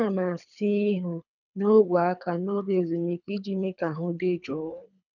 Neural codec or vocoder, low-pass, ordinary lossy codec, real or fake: codec, 24 kHz, 3 kbps, HILCodec; 7.2 kHz; none; fake